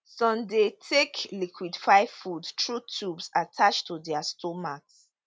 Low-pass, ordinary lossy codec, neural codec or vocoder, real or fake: none; none; none; real